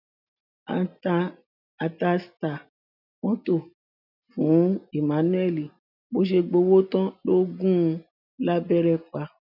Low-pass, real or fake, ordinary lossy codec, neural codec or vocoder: 5.4 kHz; real; none; none